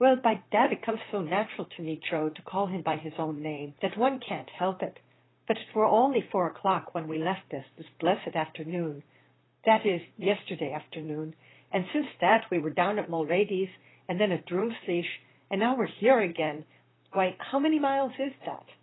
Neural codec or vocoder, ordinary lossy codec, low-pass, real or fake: vocoder, 44.1 kHz, 128 mel bands, Pupu-Vocoder; AAC, 16 kbps; 7.2 kHz; fake